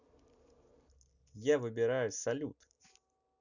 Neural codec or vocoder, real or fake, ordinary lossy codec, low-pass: none; real; none; 7.2 kHz